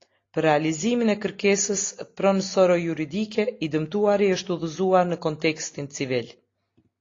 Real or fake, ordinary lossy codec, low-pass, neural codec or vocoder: real; AAC, 32 kbps; 7.2 kHz; none